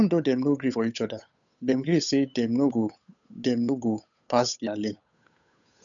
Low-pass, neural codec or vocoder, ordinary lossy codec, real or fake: 7.2 kHz; codec, 16 kHz, 8 kbps, FunCodec, trained on Chinese and English, 25 frames a second; none; fake